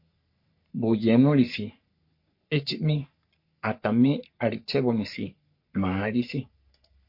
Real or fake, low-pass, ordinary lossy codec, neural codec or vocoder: fake; 5.4 kHz; MP3, 32 kbps; codec, 44.1 kHz, 3.4 kbps, Pupu-Codec